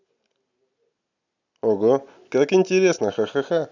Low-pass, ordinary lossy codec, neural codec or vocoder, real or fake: 7.2 kHz; none; none; real